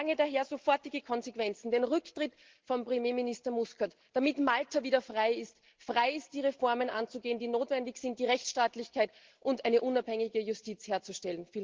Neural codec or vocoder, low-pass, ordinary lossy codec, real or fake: none; 7.2 kHz; Opus, 16 kbps; real